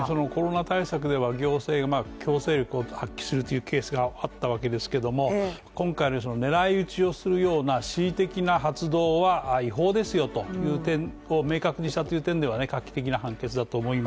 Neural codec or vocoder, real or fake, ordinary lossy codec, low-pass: none; real; none; none